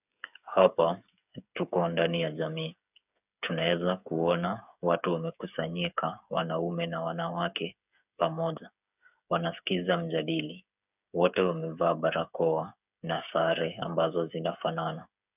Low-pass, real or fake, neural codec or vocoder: 3.6 kHz; fake; codec, 16 kHz, 8 kbps, FreqCodec, smaller model